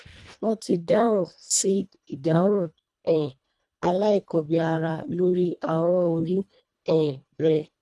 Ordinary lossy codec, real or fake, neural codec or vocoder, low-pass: none; fake; codec, 24 kHz, 1.5 kbps, HILCodec; none